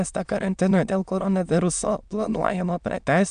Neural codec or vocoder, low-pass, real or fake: autoencoder, 22.05 kHz, a latent of 192 numbers a frame, VITS, trained on many speakers; 9.9 kHz; fake